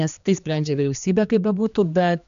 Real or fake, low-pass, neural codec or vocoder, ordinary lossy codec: fake; 7.2 kHz; codec, 16 kHz, 2 kbps, X-Codec, HuBERT features, trained on general audio; MP3, 64 kbps